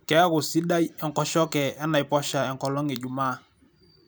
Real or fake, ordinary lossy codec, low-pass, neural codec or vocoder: fake; none; none; vocoder, 44.1 kHz, 128 mel bands every 256 samples, BigVGAN v2